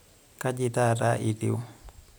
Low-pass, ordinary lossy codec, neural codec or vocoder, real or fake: none; none; none; real